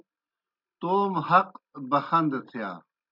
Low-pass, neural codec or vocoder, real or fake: 5.4 kHz; none; real